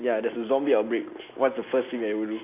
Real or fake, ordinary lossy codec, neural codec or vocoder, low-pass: real; AAC, 24 kbps; none; 3.6 kHz